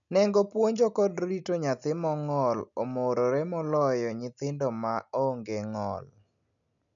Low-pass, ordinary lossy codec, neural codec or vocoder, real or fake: 7.2 kHz; none; none; real